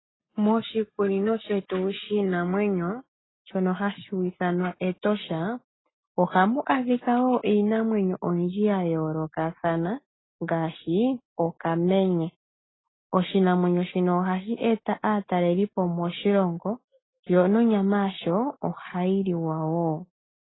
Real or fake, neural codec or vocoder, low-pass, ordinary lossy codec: real; none; 7.2 kHz; AAC, 16 kbps